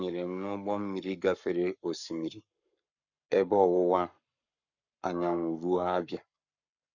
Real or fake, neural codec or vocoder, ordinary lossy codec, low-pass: fake; codec, 44.1 kHz, 7.8 kbps, Pupu-Codec; none; 7.2 kHz